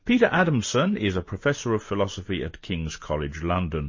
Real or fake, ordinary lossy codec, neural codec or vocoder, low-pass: real; MP3, 32 kbps; none; 7.2 kHz